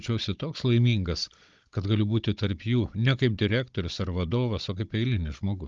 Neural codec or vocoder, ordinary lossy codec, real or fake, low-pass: codec, 16 kHz, 16 kbps, FunCodec, trained on Chinese and English, 50 frames a second; Opus, 24 kbps; fake; 7.2 kHz